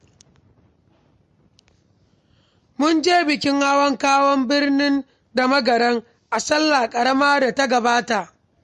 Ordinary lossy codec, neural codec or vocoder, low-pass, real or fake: MP3, 48 kbps; none; 14.4 kHz; real